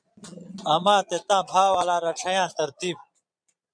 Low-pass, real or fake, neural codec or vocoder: 9.9 kHz; fake; vocoder, 22.05 kHz, 80 mel bands, Vocos